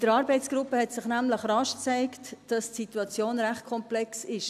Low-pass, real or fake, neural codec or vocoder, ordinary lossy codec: 14.4 kHz; real; none; none